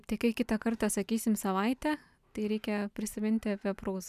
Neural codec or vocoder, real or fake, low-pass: none; real; 14.4 kHz